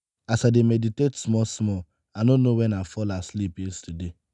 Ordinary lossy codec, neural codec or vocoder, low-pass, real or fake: none; none; 10.8 kHz; real